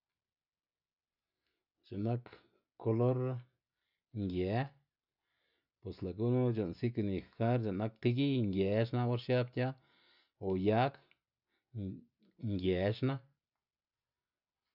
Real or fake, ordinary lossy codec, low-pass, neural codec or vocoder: real; none; 5.4 kHz; none